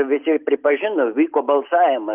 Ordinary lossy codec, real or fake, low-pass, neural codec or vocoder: Opus, 24 kbps; real; 3.6 kHz; none